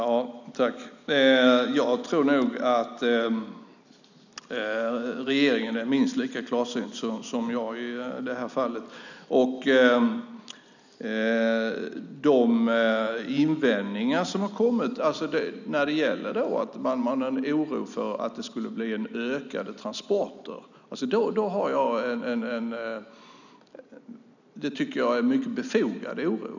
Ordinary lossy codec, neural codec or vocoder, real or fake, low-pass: none; none; real; 7.2 kHz